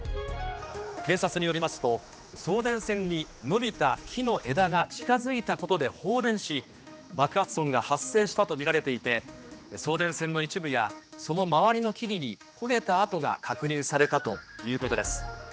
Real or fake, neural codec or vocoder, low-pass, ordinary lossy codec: fake; codec, 16 kHz, 2 kbps, X-Codec, HuBERT features, trained on general audio; none; none